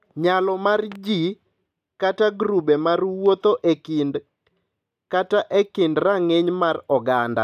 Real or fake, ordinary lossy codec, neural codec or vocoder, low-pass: real; none; none; 14.4 kHz